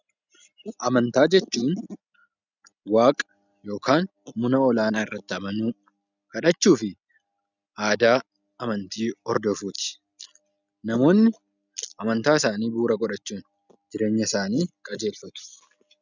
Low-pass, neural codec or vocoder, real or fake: 7.2 kHz; none; real